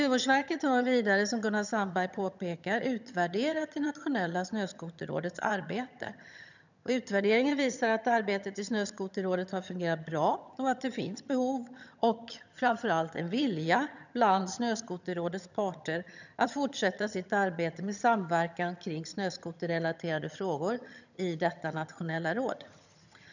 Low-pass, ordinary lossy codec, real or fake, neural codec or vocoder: 7.2 kHz; none; fake; vocoder, 22.05 kHz, 80 mel bands, HiFi-GAN